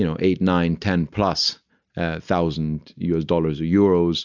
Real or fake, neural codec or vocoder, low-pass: real; none; 7.2 kHz